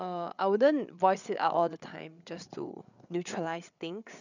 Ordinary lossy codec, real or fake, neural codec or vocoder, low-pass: none; fake; codec, 16 kHz, 8 kbps, FreqCodec, larger model; 7.2 kHz